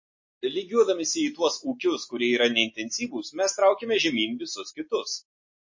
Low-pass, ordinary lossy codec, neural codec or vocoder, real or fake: 7.2 kHz; MP3, 32 kbps; none; real